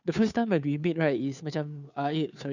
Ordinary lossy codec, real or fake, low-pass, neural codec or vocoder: none; fake; 7.2 kHz; codec, 16 kHz, 2 kbps, FunCodec, trained on Chinese and English, 25 frames a second